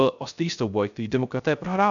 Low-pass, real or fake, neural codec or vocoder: 7.2 kHz; fake; codec, 16 kHz, 0.3 kbps, FocalCodec